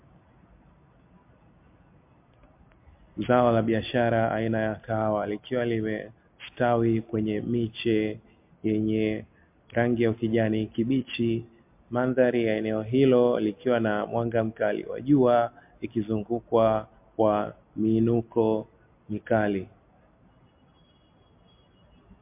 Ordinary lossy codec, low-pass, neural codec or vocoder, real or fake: MP3, 32 kbps; 3.6 kHz; none; real